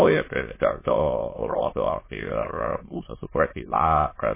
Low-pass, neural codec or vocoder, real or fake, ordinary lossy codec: 3.6 kHz; autoencoder, 22.05 kHz, a latent of 192 numbers a frame, VITS, trained on many speakers; fake; MP3, 16 kbps